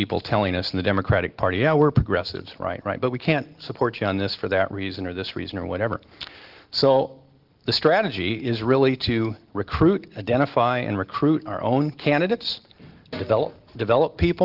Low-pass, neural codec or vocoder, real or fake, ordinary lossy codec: 5.4 kHz; none; real; Opus, 16 kbps